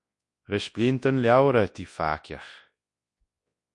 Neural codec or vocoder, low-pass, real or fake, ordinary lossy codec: codec, 24 kHz, 0.9 kbps, WavTokenizer, large speech release; 10.8 kHz; fake; MP3, 48 kbps